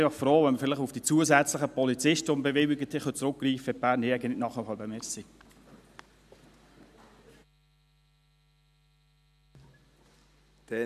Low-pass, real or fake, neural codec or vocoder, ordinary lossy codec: 14.4 kHz; real; none; none